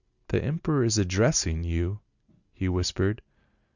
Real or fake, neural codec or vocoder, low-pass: real; none; 7.2 kHz